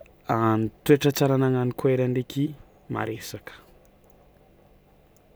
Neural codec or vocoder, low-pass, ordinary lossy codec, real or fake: none; none; none; real